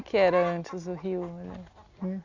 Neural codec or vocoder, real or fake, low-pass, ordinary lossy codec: none; real; 7.2 kHz; Opus, 64 kbps